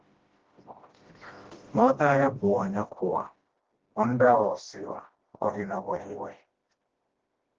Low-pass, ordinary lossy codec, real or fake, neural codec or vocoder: 7.2 kHz; Opus, 16 kbps; fake; codec, 16 kHz, 1 kbps, FreqCodec, smaller model